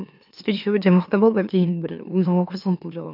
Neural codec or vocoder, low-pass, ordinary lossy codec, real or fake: autoencoder, 44.1 kHz, a latent of 192 numbers a frame, MeloTTS; 5.4 kHz; none; fake